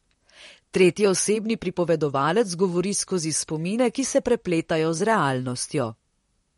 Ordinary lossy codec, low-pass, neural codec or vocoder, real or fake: MP3, 48 kbps; 19.8 kHz; vocoder, 44.1 kHz, 128 mel bands, Pupu-Vocoder; fake